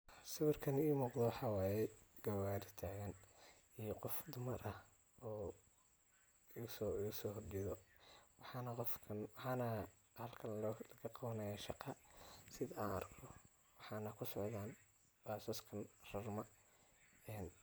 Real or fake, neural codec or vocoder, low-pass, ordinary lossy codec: real; none; none; none